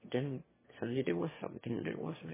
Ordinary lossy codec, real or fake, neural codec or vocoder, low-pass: MP3, 16 kbps; fake; autoencoder, 22.05 kHz, a latent of 192 numbers a frame, VITS, trained on one speaker; 3.6 kHz